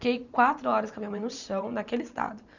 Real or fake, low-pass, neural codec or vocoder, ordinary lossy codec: fake; 7.2 kHz; vocoder, 44.1 kHz, 128 mel bands every 512 samples, BigVGAN v2; none